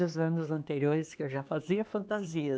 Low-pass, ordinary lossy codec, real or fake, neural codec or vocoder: none; none; fake; codec, 16 kHz, 2 kbps, X-Codec, HuBERT features, trained on LibriSpeech